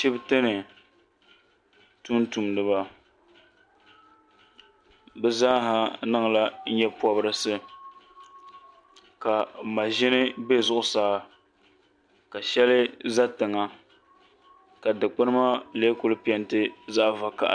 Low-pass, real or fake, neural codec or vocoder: 9.9 kHz; real; none